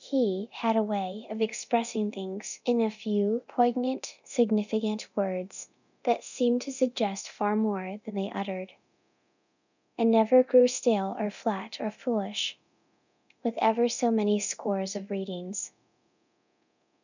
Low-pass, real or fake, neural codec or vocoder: 7.2 kHz; fake; codec, 24 kHz, 0.9 kbps, DualCodec